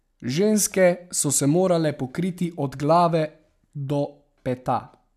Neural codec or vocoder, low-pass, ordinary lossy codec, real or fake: none; 14.4 kHz; none; real